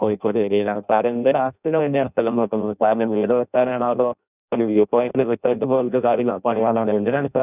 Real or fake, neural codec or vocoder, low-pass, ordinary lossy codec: fake; codec, 16 kHz in and 24 kHz out, 0.6 kbps, FireRedTTS-2 codec; 3.6 kHz; none